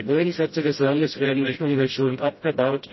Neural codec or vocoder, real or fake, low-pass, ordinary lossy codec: codec, 16 kHz, 0.5 kbps, FreqCodec, smaller model; fake; 7.2 kHz; MP3, 24 kbps